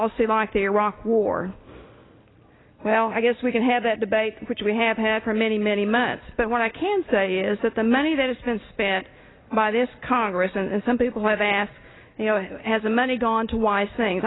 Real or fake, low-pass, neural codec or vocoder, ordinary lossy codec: real; 7.2 kHz; none; AAC, 16 kbps